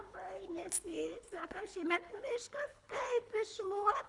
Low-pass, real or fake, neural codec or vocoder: 10.8 kHz; fake; codec, 24 kHz, 3 kbps, HILCodec